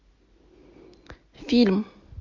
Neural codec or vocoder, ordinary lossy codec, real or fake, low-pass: none; MP3, 48 kbps; real; 7.2 kHz